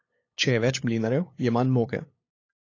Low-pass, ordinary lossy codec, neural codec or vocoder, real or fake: 7.2 kHz; AAC, 32 kbps; codec, 16 kHz, 8 kbps, FunCodec, trained on LibriTTS, 25 frames a second; fake